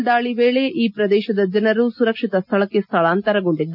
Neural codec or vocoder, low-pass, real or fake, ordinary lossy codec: none; 5.4 kHz; real; none